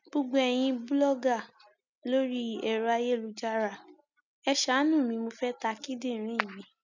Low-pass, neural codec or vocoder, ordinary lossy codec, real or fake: 7.2 kHz; none; none; real